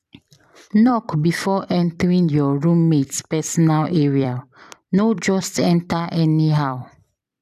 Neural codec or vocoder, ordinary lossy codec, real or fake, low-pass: vocoder, 44.1 kHz, 128 mel bands every 256 samples, BigVGAN v2; none; fake; 14.4 kHz